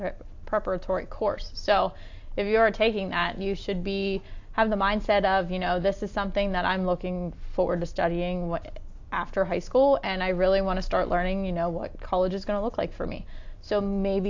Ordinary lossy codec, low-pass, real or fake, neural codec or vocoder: AAC, 48 kbps; 7.2 kHz; real; none